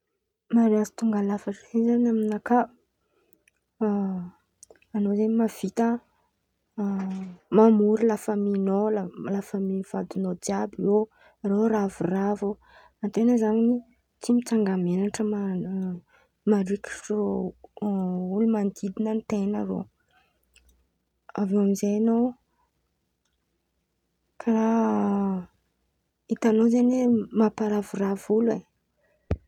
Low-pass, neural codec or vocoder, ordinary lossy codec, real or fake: 19.8 kHz; none; none; real